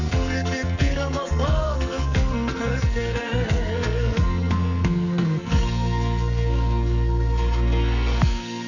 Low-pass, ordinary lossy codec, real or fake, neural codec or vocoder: 7.2 kHz; none; fake; codec, 44.1 kHz, 2.6 kbps, SNAC